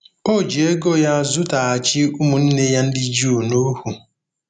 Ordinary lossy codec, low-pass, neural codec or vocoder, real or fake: none; 9.9 kHz; none; real